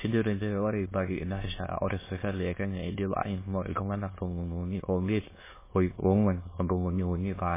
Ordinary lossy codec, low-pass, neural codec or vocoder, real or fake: MP3, 16 kbps; 3.6 kHz; autoencoder, 22.05 kHz, a latent of 192 numbers a frame, VITS, trained on many speakers; fake